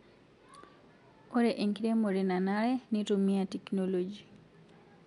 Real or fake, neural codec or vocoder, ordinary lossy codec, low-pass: real; none; none; 10.8 kHz